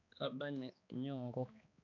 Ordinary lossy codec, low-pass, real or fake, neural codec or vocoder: none; 7.2 kHz; fake; codec, 16 kHz, 2 kbps, X-Codec, HuBERT features, trained on balanced general audio